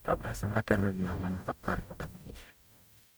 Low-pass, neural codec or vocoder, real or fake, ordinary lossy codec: none; codec, 44.1 kHz, 0.9 kbps, DAC; fake; none